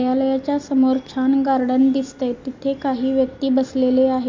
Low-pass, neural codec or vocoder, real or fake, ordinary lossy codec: 7.2 kHz; none; real; MP3, 48 kbps